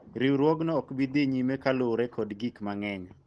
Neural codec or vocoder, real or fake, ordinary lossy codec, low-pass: none; real; Opus, 16 kbps; 10.8 kHz